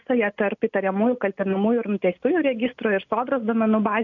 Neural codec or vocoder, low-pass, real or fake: none; 7.2 kHz; real